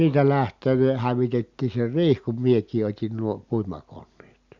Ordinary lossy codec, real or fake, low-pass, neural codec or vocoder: Opus, 64 kbps; real; 7.2 kHz; none